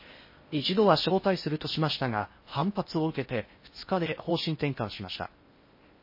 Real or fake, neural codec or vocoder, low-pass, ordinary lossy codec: fake; codec, 16 kHz in and 24 kHz out, 0.6 kbps, FocalCodec, streaming, 4096 codes; 5.4 kHz; MP3, 24 kbps